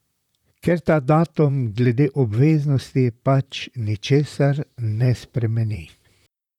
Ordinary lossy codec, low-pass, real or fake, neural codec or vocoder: none; 19.8 kHz; fake; vocoder, 44.1 kHz, 128 mel bands, Pupu-Vocoder